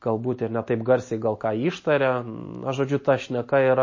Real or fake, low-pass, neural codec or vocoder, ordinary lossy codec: real; 7.2 kHz; none; MP3, 32 kbps